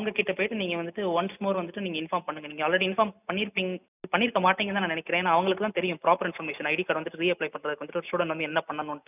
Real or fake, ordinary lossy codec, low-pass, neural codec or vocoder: real; none; 3.6 kHz; none